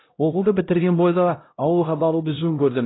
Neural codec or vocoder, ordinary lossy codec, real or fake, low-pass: codec, 16 kHz, 0.5 kbps, X-Codec, HuBERT features, trained on LibriSpeech; AAC, 16 kbps; fake; 7.2 kHz